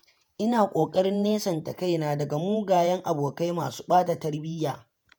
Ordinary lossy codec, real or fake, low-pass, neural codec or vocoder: none; fake; none; vocoder, 48 kHz, 128 mel bands, Vocos